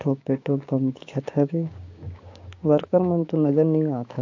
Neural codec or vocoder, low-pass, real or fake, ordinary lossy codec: codec, 16 kHz, 6 kbps, DAC; 7.2 kHz; fake; AAC, 48 kbps